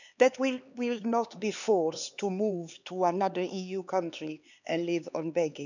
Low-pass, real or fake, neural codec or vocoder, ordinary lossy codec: 7.2 kHz; fake; codec, 16 kHz, 4 kbps, X-Codec, HuBERT features, trained on LibriSpeech; none